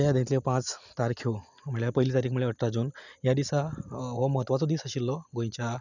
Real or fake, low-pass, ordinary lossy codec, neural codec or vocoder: real; 7.2 kHz; none; none